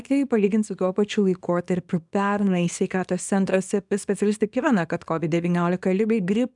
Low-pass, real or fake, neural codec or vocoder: 10.8 kHz; fake; codec, 24 kHz, 0.9 kbps, WavTokenizer, small release